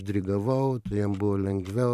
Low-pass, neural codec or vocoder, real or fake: 14.4 kHz; vocoder, 44.1 kHz, 128 mel bands every 512 samples, BigVGAN v2; fake